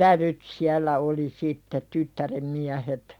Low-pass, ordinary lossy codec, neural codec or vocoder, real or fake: 19.8 kHz; none; vocoder, 44.1 kHz, 128 mel bands every 256 samples, BigVGAN v2; fake